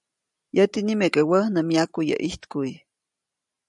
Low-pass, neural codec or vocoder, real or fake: 10.8 kHz; none; real